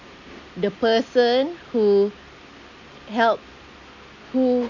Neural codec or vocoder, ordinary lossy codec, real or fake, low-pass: none; none; real; 7.2 kHz